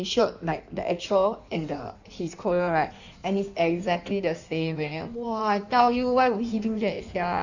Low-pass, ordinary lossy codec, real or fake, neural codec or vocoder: 7.2 kHz; none; fake; codec, 16 kHz in and 24 kHz out, 1.1 kbps, FireRedTTS-2 codec